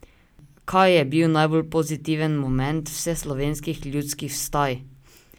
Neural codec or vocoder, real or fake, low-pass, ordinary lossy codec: none; real; none; none